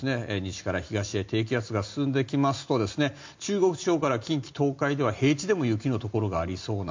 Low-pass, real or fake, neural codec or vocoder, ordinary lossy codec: 7.2 kHz; real; none; MP3, 48 kbps